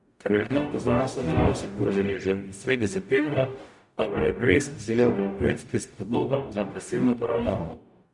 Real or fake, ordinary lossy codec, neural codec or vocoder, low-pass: fake; none; codec, 44.1 kHz, 0.9 kbps, DAC; 10.8 kHz